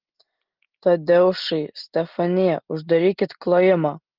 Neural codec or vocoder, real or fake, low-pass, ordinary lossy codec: none; real; 5.4 kHz; Opus, 16 kbps